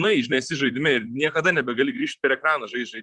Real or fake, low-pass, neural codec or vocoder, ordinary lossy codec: fake; 10.8 kHz; vocoder, 44.1 kHz, 128 mel bands, Pupu-Vocoder; Opus, 64 kbps